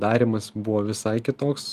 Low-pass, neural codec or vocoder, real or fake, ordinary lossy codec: 14.4 kHz; none; real; Opus, 32 kbps